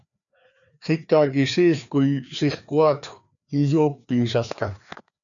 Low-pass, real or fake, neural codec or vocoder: 7.2 kHz; fake; codec, 16 kHz, 2 kbps, FreqCodec, larger model